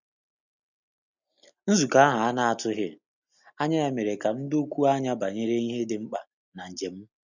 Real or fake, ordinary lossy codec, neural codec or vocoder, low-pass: real; none; none; 7.2 kHz